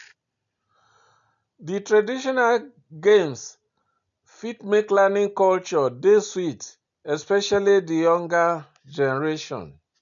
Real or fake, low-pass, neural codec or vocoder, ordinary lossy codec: real; 7.2 kHz; none; none